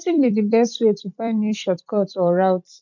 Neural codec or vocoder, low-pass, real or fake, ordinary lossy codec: vocoder, 44.1 kHz, 128 mel bands every 256 samples, BigVGAN v2; 7.2 kHz; fake; none